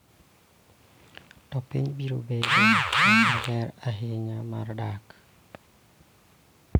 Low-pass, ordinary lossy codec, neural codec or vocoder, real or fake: none; none; none; real